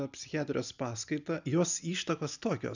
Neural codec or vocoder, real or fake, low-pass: none; real; 7.2 kHz